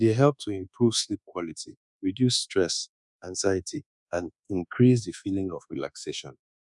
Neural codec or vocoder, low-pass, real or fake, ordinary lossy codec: codec, 24 kHz, 1.2 kbps, DualCodec; 10.8 kHz; fake; none